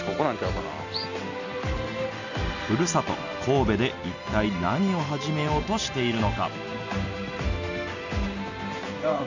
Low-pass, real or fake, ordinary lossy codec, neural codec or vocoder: 7.2 kHz; real; none; none